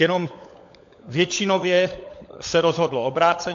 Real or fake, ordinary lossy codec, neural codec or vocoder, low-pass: fake; AAC, 48 kbps; codec, 16 kHz, 16 kbps, FunCodec, trained on LibriTTS, 50 frames a second; 7.2 kHz